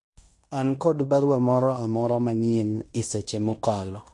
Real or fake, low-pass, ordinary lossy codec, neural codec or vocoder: fake; 10.8 kHz; MP3, 48 kbps; codec, 16 kHz in and 24 kHz out, 0.9 kbps, LongCat-Audio-Codec, fine tuned four codebook decoder